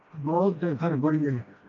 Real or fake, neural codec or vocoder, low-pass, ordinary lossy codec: fake; codec, 16 kHz, 1 kbps, FreqCodec, smaller model; 7.2 kHz; MP3, 64 kbps